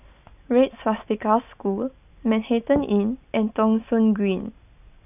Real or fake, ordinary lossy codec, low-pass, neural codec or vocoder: real; none; 3.6 kHz; none